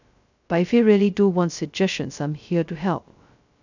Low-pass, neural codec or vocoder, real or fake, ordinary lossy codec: 7.2 kHz; codec, 16 kHz, 0.2 kbps, FocalCodec; fake; none